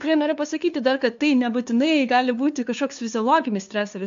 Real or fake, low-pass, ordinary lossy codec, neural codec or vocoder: fake; 7.2 kHz; AAC, 64 kbps; codec, 16 kHz, 2 kbps, FunCodec, trained on LibriTTS, 25 frames a second